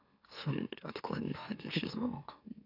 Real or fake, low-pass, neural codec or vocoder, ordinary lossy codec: fake; 5.4 kHz; autoencoder, 44.1 kHz, a latent of 192 numbers a frame, MeloTTS; AAC, 48 kbps